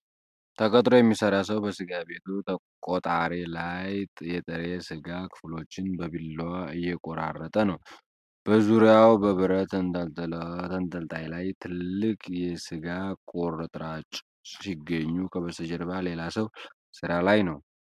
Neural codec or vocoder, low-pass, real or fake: none; 14.4 kHz; real